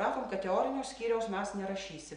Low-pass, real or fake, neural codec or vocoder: 9.9 kHz; real; none